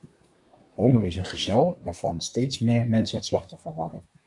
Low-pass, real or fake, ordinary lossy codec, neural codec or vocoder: 10.8 kHz; fake; MP3, 96 kbps; codec, 24 kHz, 1 kbps, SNAC